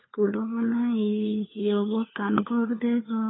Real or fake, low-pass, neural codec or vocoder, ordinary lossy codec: fake; 7.2 kHz; codec, 16 kHz, 4 kbps, FunCodec, trained on LibriTTS, 50 frames a second; AAC, 16 kbps